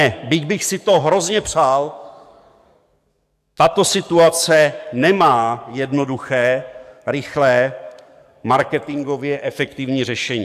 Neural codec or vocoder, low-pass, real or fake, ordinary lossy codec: codec, 44.1 kHz, 7.8 kbps, DAC; 14.4 kHz; fake; AAC, 96 kbps